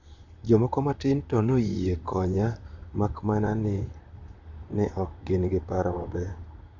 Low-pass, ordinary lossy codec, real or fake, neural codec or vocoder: 7.2 kHz; none; fake; vocoder, 44.1 kHz, 128 mel bands, Pupu-Vocoder